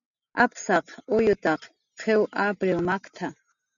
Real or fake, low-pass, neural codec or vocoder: real; 7.2 kHz; none